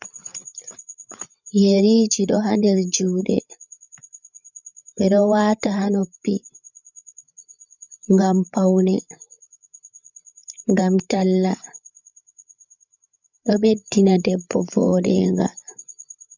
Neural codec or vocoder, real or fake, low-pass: codec, 16 kHz, 8 kbps, FreqCodec, larger model; fake; 7.2 kHz